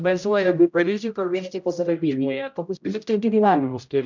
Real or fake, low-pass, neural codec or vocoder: fake; 7.2 kHz; codec, 16 kHz, 0.5 kbps, X-Codec, HuBERT features, trained on general audio